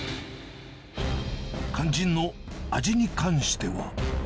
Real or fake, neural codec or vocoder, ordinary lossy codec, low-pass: real; none; none; none